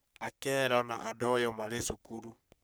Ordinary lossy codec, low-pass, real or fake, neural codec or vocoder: none; none; fake; codec, 44.1 kHz, 3.4 kbps, Pupu-Codec